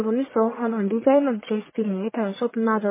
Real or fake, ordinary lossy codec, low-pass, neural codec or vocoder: fake; MP3, 16 kbps; 3.6 kHz; codec, 44.1 kHz, 1.7 kbps, Pupu-Codec